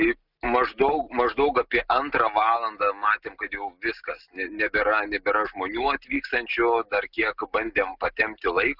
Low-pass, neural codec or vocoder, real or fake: 5.4 kHz; none; real